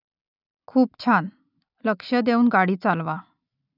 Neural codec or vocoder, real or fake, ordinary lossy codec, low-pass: none; real; none; 5.4 kHz